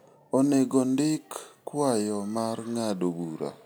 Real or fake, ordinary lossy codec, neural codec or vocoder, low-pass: real; none; none; none